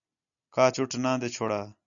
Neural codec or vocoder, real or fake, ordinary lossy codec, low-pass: none; real; MP3, 96 kbps; 7.2 kHz